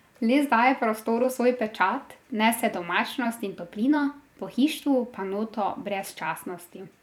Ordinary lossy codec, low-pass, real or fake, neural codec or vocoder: none; 19.8 kHz; fake; vocoder, 44.1 kHz, 128 mel bands every 256 samples, BigVGAN v2